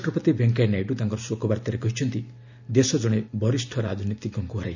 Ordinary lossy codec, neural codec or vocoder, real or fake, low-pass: none; none; real; 7.2 kHz